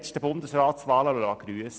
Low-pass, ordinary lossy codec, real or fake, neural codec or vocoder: none; none; real; none